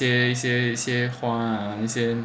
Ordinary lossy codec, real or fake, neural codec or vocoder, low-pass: none; real; none; none